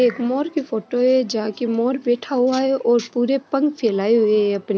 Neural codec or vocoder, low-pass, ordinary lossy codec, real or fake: none; none; none; real